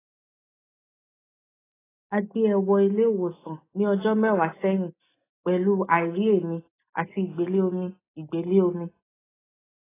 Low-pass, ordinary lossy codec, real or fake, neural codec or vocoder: 3.6 kHz; AAC, 16 kbps; real; none